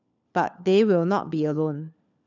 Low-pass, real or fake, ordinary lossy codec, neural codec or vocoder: 7.2 kHz; fake; none; codec, 16 kHz, 4 kbps, FunCodec, trained on LibriTTS, 50 frames a second